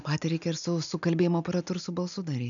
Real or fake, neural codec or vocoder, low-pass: real; none; 7.2 kHz